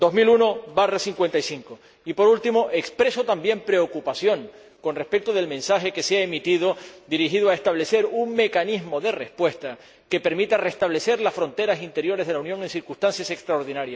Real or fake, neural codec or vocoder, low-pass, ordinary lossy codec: real; none; none; none